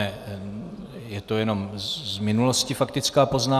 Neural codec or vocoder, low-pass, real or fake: none; 14.4 kHz; real